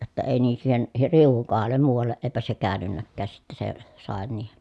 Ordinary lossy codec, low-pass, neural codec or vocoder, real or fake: none; none; none; real